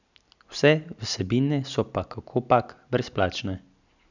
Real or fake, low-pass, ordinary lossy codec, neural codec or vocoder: real; 7.2 kHz; none; none